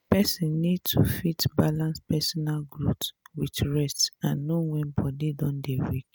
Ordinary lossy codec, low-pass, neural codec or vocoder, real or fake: none; none; none; real